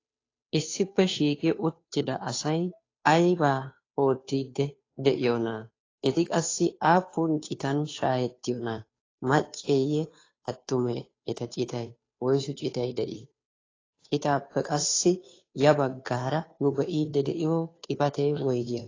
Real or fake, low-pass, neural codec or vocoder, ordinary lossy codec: fake; 7.2 kHz; codec, 16 kHz, 2 kbps, FunCodec, trained on Chinese and English, 25 frames a second; AAC, 32 kbps